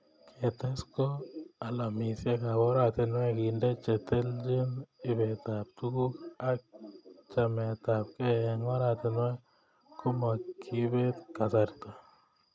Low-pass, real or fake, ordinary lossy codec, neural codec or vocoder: none; real; none; none